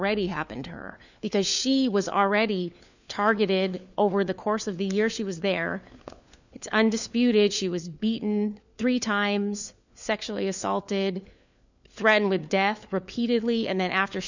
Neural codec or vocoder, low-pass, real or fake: codec, 16 kHz, 4 kbps, FunCodec, trained on LibriTTS, 50 frames a second; 7.2 kHz; fake